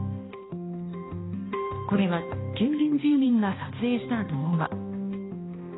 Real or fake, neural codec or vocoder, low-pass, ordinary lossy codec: fake; codec, 16 kHz, 1 kbps, X-Codec, HuBERT features, trained on balanced general audio; 7.2 kHz; AAC, 16 kbps